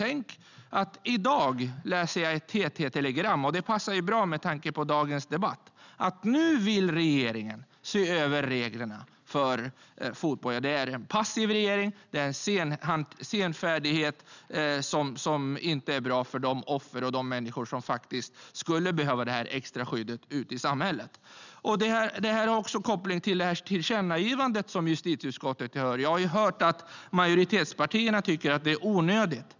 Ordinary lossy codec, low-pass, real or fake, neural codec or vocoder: none; 7.2 kHz; real; none